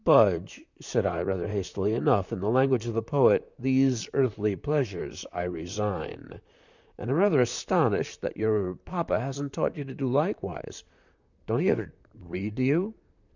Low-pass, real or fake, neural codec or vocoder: 7.2 kHz; fake; vocoder, 44.1 kHz, 128 mel bands, Pupu-Vocoder